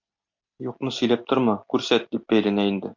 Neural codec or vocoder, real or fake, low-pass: none; real; 7.2 kHz